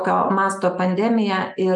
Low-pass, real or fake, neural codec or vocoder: 10.8 kHz; real; none